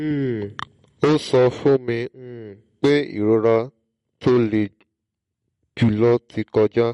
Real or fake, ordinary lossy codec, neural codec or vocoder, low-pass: real; MP3, 48 kbps; none; 19.8 kHz